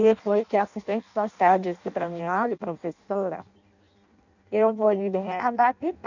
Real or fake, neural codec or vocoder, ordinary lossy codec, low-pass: fake; codec, 16 kHz in and 24 kHz out, 0.6 kbps, FireRedTTS-2 codec; none; 7.2 kHz